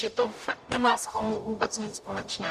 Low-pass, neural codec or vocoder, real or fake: 14.4 kHz; codec, 44.1 kHz, 0.9 kbps, DAC; fake